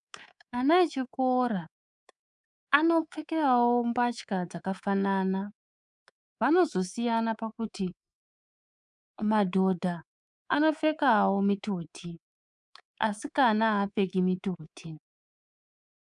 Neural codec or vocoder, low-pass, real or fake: codec, 24 kHz, 3.1 kbps, DualCodec; 10.8 kHz; fake